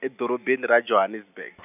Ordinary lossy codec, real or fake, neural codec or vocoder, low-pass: none; real; none; 3.6 kHz